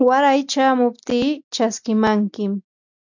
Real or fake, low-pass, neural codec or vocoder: real; 7.2 kHz; none